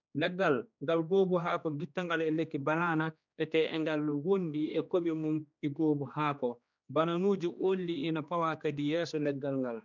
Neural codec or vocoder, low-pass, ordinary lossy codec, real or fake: codec, 16 kHz, 2 kbps, X-Codec, HuBERT features, trained on general audio; 7.2 kHz; none; fake